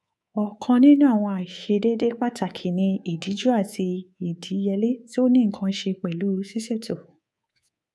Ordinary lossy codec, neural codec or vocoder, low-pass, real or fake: none; codec, 24 kHz, 3.1 kbps, DualCodec; none; fake